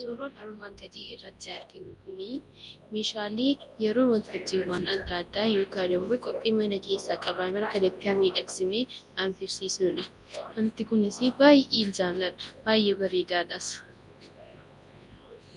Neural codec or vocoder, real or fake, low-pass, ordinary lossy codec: codec, 24 kHz, 0.9 kbps, WavTokenizer, large speech release; fake; 10.8 kHz; MP3, 64 kbps